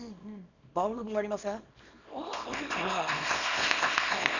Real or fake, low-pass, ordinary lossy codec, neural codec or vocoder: fake; 7.2 kHz; none; codec, 24 kHz, 0.9 kbps, WavTokenizer, small release